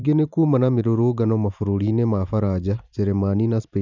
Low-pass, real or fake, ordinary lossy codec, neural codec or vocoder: 7.2 kHz; fake; none; vocoder, 44.1 kHz, 128 mel bands every 512 samples, BigVGAN v2